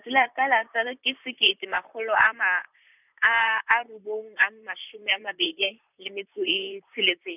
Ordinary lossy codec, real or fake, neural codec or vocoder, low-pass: none; fake; vocoder, 44.1 kHz, 128 mel bands, Pupu-Vocoder; 3.6 kHz